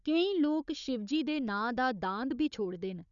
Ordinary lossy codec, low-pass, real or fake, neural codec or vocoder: none; 7.2 kHz; fake; codec, 16 kHz, 4 kbps, FunCodec, trained on Chinese and English, 50 frames a second